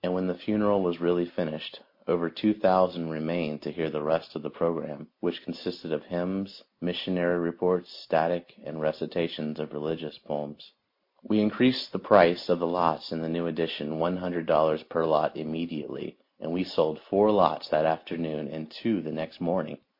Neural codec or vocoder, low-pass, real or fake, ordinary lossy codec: none; 5.4 kHz; real; MP3, 32 kbps